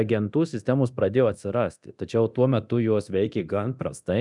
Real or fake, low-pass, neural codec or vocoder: fake; 10.8 kHz; codec, 24 kHz, 0.9 kbps, DualCodec